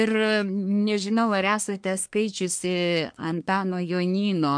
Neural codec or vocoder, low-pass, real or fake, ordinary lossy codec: codec, 24 kHz, 1 kbps, SNAC; 9.9 kHz; fake; MP3, 64 kbps